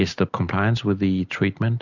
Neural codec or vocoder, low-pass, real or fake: none; 7.2 kHz; real